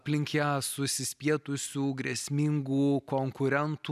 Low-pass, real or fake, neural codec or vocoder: 14.4 kHz; real; none